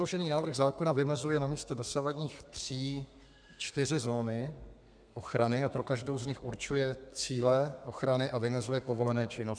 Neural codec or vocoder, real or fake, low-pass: codec, 32 kHz, 1.9 kbps, SNAC; fake; 9.9 kHz